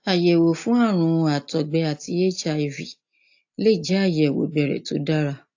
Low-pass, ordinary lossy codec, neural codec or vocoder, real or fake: 7.2 kHz; AAC, 48 kbps; none; real